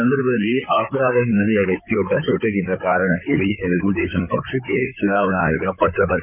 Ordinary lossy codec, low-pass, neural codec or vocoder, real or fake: none; 3.6 kHz; codec, 16 kHz in and 24 kHz out, 2.2 kbps, FireRedTTS-2 codec; fake